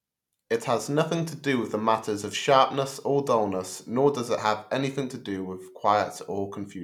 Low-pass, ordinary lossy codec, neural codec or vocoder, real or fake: 19.8 kHz; none; none; real